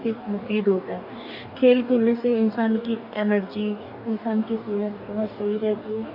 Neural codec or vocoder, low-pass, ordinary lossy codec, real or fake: codec, 44.1 kHz, 2.6 kbps, DAC; 5.4 kHz; AAC, 48 kbps; fake